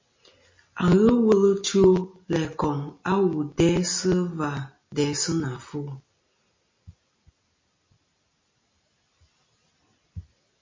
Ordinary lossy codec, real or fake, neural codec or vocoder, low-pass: MP3, 32 kbps; real; none; 7.2 kHz